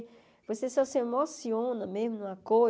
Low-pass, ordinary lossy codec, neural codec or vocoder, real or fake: none; none; none; real